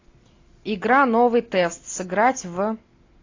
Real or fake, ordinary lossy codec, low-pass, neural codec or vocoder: real; AAC, 32 kbps; 7.2 kHz; none